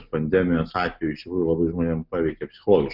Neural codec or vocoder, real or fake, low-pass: none; real; 5.4 kHz